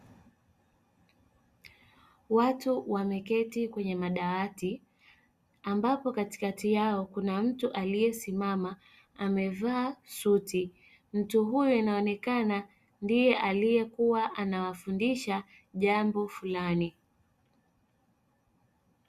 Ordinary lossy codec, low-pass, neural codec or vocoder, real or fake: Opus, 64 kbps; 14.4 kHz; none; real